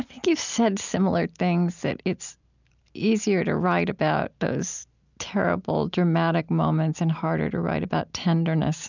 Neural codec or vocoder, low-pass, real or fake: none; 7.2 kHz; real